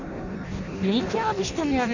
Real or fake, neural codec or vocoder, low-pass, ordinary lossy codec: fake; codec, 16 kHz in and 24 kHz out, 0.6 kbps, FireRedTTS-2 codec; 7.2 kHz; none